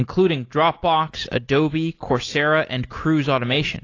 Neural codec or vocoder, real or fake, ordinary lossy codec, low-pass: none; real; AAC, 32 kbps; 7.2 kHz